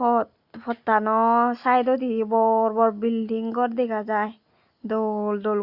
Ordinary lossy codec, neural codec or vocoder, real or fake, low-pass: Opus, 64 kbps; none; real; 5.4 kHz